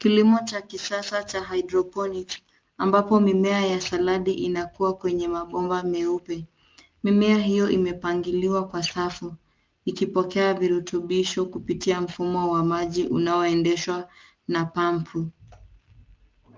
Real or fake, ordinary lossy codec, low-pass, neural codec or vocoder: real; Opus, 32 kbps; 7.2 kHz; none